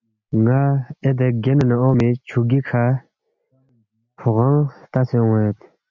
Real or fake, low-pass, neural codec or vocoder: real; 7.2 kHz; none